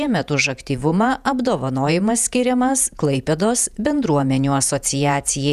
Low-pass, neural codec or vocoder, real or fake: 14.4 kHz; vocoder, 48 kHz, 128 mel bands, Vocos; fake